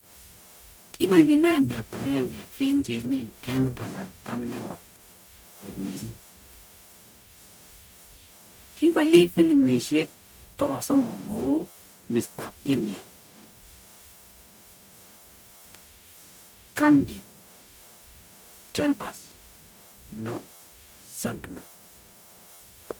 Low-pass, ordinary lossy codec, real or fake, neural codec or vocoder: none; none; fake; codec, 44.1 kHz, 0.9 kbps, DAC